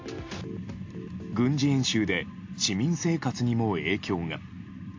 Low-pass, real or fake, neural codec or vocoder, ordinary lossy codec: 7.2 kHz; real; none; AAC, 48 kbps